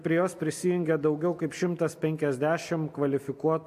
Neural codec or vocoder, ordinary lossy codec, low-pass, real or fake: none; MP3, 64 kbps; 14.4 kHz; real